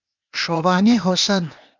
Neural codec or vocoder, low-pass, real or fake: codec, 16 kHz, 0.8 kbps, ZipCodec; 7.2 kHz; fake